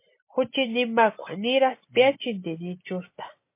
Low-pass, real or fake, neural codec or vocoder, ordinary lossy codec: 3.6 kHz; real; none; MP3, 24 kbps